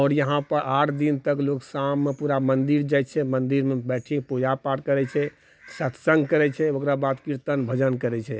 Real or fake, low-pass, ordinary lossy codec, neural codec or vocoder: real; none; none; none